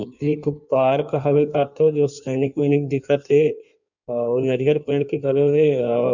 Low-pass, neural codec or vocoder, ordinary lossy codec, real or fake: 7.2 kHz; codec, 16 kHz in and 24 kHz out, 1.1 kbps, FireRedTTS-2 codec; none; fake